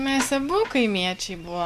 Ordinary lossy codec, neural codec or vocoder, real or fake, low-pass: MP3, 96 kbps; none; real; 14.4 kHz